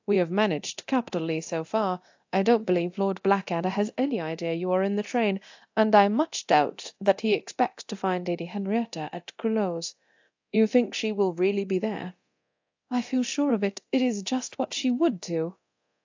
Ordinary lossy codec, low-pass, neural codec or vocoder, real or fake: AAC, 48 kbps; 7.2 kHz; codec, 24 kHz, 0.9 kbps, DualCodec; fake